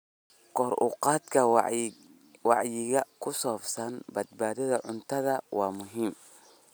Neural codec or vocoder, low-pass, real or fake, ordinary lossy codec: none; none; real; none